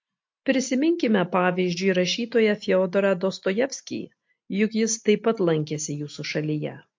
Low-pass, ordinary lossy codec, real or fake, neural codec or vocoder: 7.2 kHz; MP3, 48 kbps; real; none